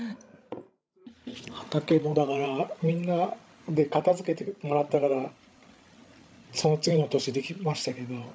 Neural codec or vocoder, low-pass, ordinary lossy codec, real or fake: codec, 16 kHz, 16 kbps, FreqCodec, larger model; none; none; fake